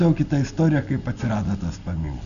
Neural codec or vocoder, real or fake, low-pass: none; real; 7.2 kHz